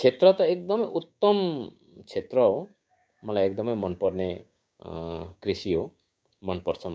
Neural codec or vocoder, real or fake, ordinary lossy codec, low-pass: codec, 16 kHz, 6 kbps, DAC; fake; none; none